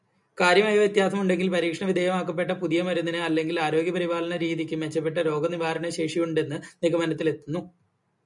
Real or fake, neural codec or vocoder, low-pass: real; none; 10.8 kHz